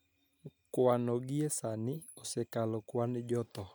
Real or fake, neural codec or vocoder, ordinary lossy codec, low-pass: real; none; none; none